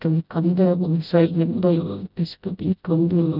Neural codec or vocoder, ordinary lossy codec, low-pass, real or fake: codec, 16 kHz, 0.5 kbps, FreqCodec, smaller model; none; 5.4 kHz; fake